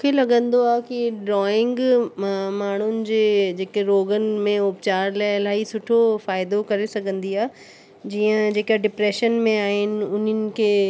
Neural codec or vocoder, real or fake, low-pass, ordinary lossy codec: none; real; none; none